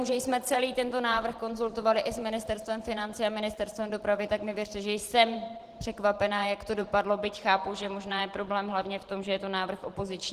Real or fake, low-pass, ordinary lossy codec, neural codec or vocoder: fake; 14.4 kHz; Opus, 16 kbps; vocoder, 44.1 kHz, 128 mel bands every 512 samples, BigVGAN v2